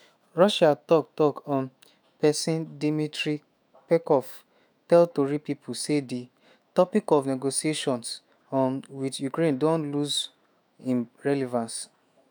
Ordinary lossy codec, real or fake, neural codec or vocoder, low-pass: none; fake; autoencoder, 48 kHz, 128 numbers a frame, DAC-VAE, trained on Japanese speech; none